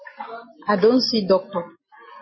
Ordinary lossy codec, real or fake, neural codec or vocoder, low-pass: MP3, 24 kbps; real; none; 7.2 kHz